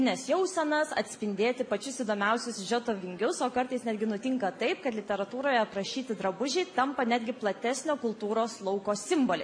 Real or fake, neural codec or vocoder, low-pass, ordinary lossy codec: real; none; 9.9 kHz; AAC, 64 kbps